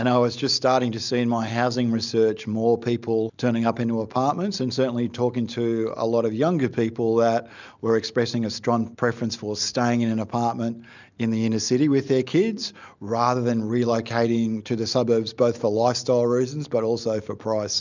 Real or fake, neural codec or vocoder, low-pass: real; none; 7.2 kHz